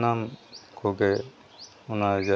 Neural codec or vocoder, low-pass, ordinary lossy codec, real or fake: none; none; none; real